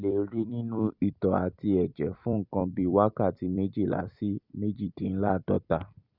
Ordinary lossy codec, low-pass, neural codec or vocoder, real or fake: none; 5.4 kHz; vocoder, 44.1 kHz, 128 mel bands every 256 samples, BigVGAN v2; fake